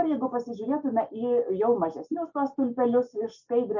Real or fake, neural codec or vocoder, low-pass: real; none; 7.2 kHz